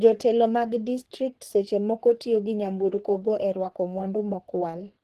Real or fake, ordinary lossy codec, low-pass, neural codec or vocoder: fake; Opus, 16 kbps; 14.4 kHz; codec, 44.1 kHz, 3.4 kbps, Pupu-Codec